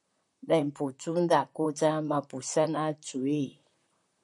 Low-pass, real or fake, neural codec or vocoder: 10.8 kHz; fake; vocoder, 44.1 kHz, 128 mel bands, Pupu-Vocoder